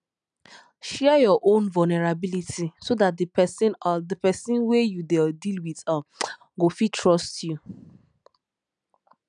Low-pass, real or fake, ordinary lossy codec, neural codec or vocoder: 9.9 kHz; real; none; none